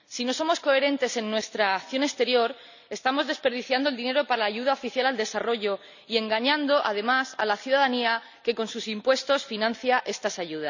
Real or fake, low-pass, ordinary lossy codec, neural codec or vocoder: real; 7.2 kHz; none; none